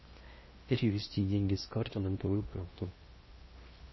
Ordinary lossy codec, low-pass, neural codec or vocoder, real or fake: MP3, 24 kbps; 7.2 kHz; codec, 16 kHz in and 24 kHz out, 0.6 kbps, FocalCodec, streaming, 4096 codes; fake